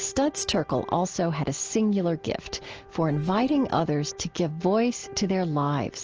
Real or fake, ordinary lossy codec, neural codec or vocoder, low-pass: real; Opus, 16 kbps; none; 7.2 kHz